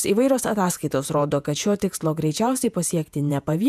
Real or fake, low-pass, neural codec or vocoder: fake; 14.4 kHz; vocoder, 48 kHz, 128 mel bands, Vocos